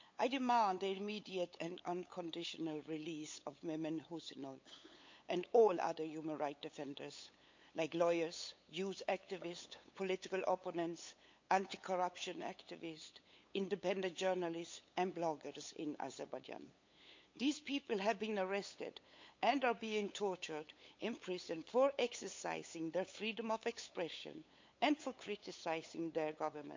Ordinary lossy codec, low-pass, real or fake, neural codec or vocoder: MP3, 48 kbps; 7.2 kHz; fake; codec, 16 kHz, 8 kbps, FunCodec, trained on LibriTTS, 25 frames a second